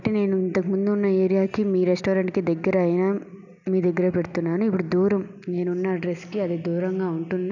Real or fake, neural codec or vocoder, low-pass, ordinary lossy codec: real; none; 7.2 kHz; none